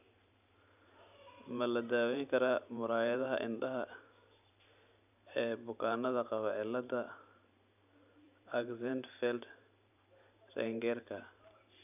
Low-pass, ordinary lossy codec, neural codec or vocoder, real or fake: 3.6 kHz; none; vocoder, 44.1 kHz, 128 mel bands every 512 samples, BigVGAN v2; fake